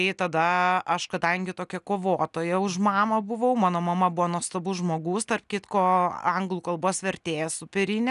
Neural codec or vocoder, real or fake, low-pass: none; real; 10.8 kHz